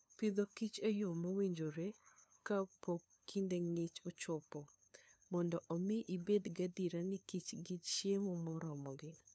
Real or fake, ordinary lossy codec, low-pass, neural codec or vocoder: fake; none; none; codec, 16 kHz, 2 kbps, FunCodec, trained on LibriTTS, 25 frames a second